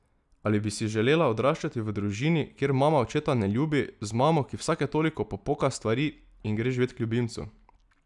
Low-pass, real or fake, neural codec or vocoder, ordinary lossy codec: 10.8 kHz; real; none; none